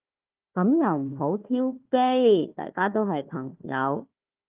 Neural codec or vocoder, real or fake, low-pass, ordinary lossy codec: codec, 16 kHz, 1 kbps, FunCodec, trained on Chinese and English, 50 frames a second; fake; 3.6 kHz; Opus, 32 kbps